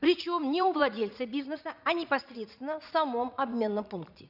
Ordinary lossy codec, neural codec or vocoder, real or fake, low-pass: none; vocoder, 44.1 kHz, 80 mel bands, Vocos; fake; 5.4 kHz